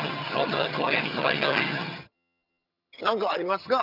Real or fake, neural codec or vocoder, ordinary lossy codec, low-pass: fake; vocoder, 22.05 kHz, 80 mel bands, HiFi-GAN; none; 5.4 kHz